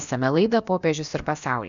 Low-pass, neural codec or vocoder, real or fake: 7.2 kHz; codec, 16 kHz, about 1 kbps, DyCAST, with the encoder's durations; fake